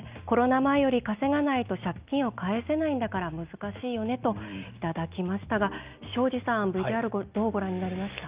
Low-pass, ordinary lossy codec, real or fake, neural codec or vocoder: 3.6 kHz; Opus, 24 kbps; real; none